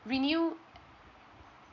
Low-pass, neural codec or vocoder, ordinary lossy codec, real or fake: 7.2 kHz; none; none; real